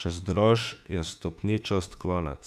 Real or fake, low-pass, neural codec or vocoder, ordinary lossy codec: fake; 14.4 kHz; autoencoder, 48 kHz, 32 numbers a frame, DAC-VAE, trained on Japanese speech; none